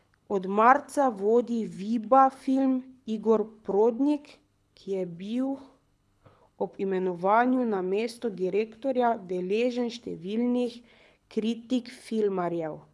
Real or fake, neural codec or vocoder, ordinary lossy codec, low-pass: fake; codec, 24 kHz, 6 kbps, HILCodec; none; none